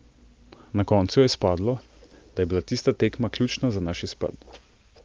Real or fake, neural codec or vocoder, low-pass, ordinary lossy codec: real; none; 7.2 kHz; Opus, 32 kbps